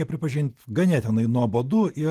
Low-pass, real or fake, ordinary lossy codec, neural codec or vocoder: 14.4 kHz; real; Opus, 24 kbps; none